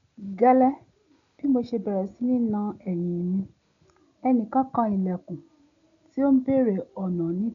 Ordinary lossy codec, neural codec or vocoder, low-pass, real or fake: MP3, 64 kbps; none; 7.2 kHz; real